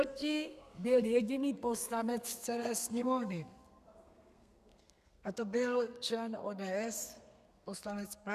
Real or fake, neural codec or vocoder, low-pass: fake; codec, 32 kHz, 1.9 kbps, SNAC; 14.4 kHz